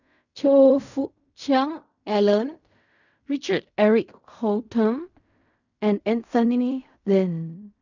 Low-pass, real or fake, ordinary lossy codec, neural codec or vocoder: 7.2 kHz; fake; none; codec, 16 kHz in and 24 kHz out, 0.4 kbps, LongCat-Audio-Codec, fine tuned four codebook decoder